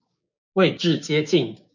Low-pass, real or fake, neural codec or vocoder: 7.2 kHz; fake; codec, 16 kHz, 6 kbps, DAC